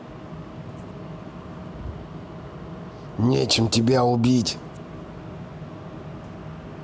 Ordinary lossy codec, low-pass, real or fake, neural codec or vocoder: none; none; real; none